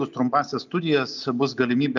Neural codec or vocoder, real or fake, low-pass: none; real; 7.2 kHz